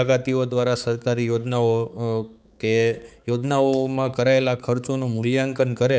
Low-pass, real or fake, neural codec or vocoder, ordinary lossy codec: none; fake; codec, 16 kHz, 4 kbps, X-Codec, HuBERT features, trained on balanced general audio; none